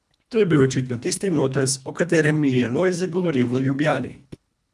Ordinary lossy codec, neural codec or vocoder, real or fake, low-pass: none; codec, 24 kHz, 1.5 kbps, HILCodec; fake; none